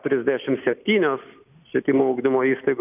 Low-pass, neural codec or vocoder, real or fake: 3.6 kHz; none; real